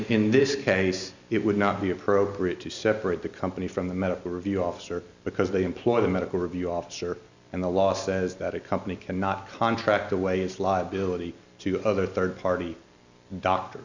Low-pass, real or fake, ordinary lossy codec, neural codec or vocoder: 7.2 kHz; real; Opus, 64 kbps; none